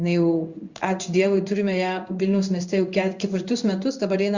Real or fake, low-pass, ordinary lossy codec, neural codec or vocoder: fake; 7.2 kHz; Opus, 64 kbps; codec, 16 kHz in and 24 kHz out, 1 kbps, XY-Tokenizer